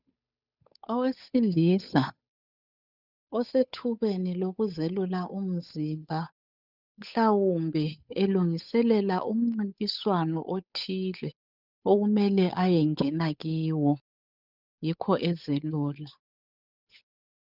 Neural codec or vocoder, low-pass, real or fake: codec, 16 kHz, 8 kbps, FunCodec, trained on Chinese and English, 25 frames a second; 5.4 kHz; fake